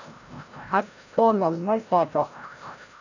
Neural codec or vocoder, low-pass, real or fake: codec, 16 kHz, 0.5 kbps, FreqCodec, larger model; 7.2 kHz; fake